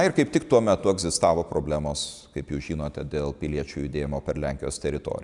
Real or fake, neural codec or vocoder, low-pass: real; none; 10.8 kHz